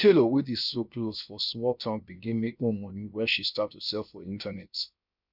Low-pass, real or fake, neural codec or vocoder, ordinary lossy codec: 5.4 kHz; fake; codec, 16 kHz, 0.7 kbps, FocalCodec; none